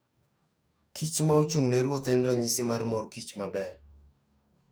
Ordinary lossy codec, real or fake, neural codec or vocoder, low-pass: none; fake; codec, 44.1 kHz, 2.6 kbps, DAC; none